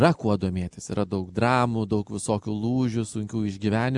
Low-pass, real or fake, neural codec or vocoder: 10.8 kHz; real; none